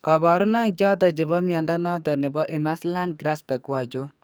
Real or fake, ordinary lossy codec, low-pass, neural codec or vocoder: fake; none; none; codec, 44.1 kHz, 2.6 kbps, SNAC